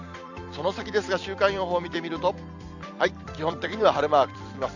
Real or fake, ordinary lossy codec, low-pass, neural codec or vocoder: fake; none; 7.2 kHz; vocoder, 44.1 kHz, 128 mel bands every 256 samples, BigVGAN v2